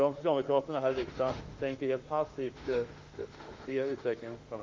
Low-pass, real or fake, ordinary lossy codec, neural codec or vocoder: 7.2 kHz; fake; Opus, 32 kbps; autoencoder, 48 kHz, 32 numbers a frame, DAC-VAE, trained on Japanese speech